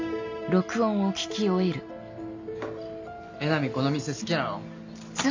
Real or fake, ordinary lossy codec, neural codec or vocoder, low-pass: real; MP3, 48 kbps; none; 7.2 kHz